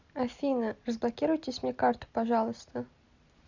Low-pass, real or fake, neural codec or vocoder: 7.2 kHz; real; none